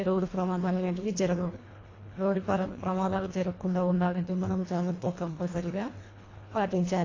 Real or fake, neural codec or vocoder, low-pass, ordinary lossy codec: fake; codec, 24 kHz, 1.5 kbps, HILCodec; 7.2 kHz; AAC, 32 kbps